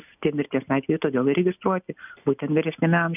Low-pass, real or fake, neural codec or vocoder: 3.6 kHz; real; none